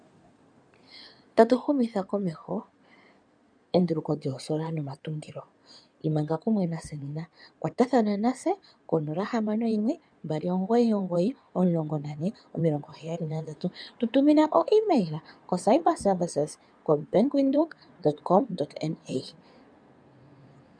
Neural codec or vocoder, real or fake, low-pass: codec, 16 kHz in and 24 kHz out, 2.2 kbps, FireRedTTS-2 codec; fake; 9.9 kHz